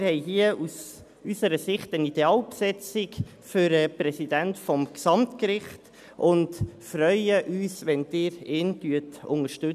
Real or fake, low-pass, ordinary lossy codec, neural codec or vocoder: real; 14.4 kHz; none; none